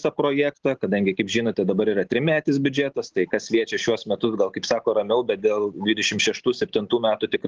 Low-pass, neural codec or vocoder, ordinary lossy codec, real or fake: 7.2 kHz; none; Opus, 16 kbps; real